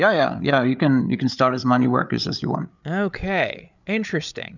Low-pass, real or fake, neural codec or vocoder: 7.2 kHz; fake; codec, 16 kHz, 8 kbps, FreqCodec, larger model